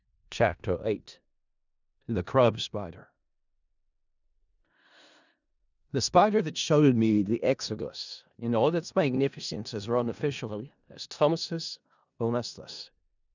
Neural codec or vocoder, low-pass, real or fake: codec, 16 kHz in and 24 kHz out, 0.4 kbps, LongCat-Audio-Codec, four codebook decoder; 7.2 kHz; fake